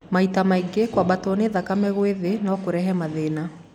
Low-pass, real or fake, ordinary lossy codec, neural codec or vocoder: 19.8 kHz; real; none; none